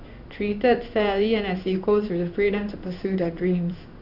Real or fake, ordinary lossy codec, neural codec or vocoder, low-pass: fake; none; codec, 16 kHz in and 24 kHz out, 1 kbps, XY-Tokenizer; 5.4 kHz